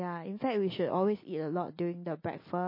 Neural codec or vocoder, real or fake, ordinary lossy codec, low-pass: none; real; MP3, 24 kbps; 5.4 kHz